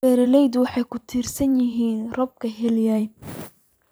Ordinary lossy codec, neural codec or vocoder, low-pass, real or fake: none; vocoder, 44.1 kHz, 128 mel bands every 512 samples, BigVGAN v2; none; fake